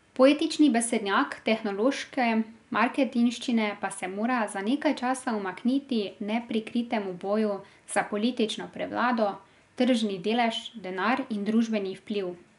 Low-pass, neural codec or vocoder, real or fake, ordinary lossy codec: 10.8 kHz; none; real; none